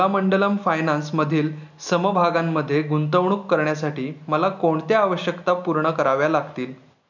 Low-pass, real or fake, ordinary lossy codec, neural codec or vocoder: 7.2 kHz; real; none; none